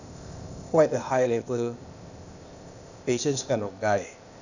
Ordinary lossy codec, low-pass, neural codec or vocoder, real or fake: none; 7.2 kHz; codec, 16 kHz, 0.8 kbps, ZipCodec; fake